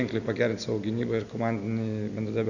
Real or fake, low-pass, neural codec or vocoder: real; 7.2 kHz; none